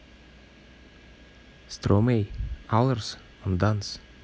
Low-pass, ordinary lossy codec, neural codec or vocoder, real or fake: none; none; none; real